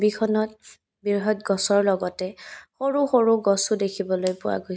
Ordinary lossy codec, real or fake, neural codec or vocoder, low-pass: none; real; none; none